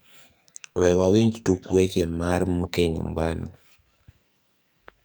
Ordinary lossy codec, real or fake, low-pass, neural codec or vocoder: none; fake; none; codec, 44.1 kHz, 2.6 kbps, SNAC